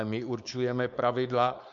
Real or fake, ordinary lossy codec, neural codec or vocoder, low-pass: fake; MP3, 64 kbps; codec, 16 kHz, 4.8 kbps, FACodec; 7.2 kHz